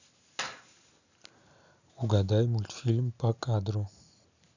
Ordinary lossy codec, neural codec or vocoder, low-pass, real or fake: none; none; 7.2 kHz; real